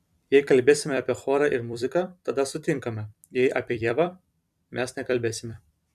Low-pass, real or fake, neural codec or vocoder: 14.4 kHz; fake; vocoder, 44.1 kHz, 128 mel bands every 256 samples, BigVGAN v2